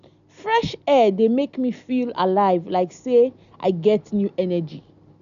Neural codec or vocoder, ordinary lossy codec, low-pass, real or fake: none; none; 7.2 kHz; real